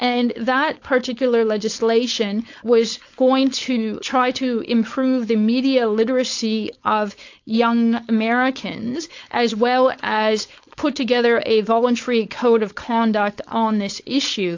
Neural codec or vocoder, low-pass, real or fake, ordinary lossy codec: codec, 16 kHz, 4.8 kbps, FACodec; 7.2 kHz; fake; AAC, 48 kbps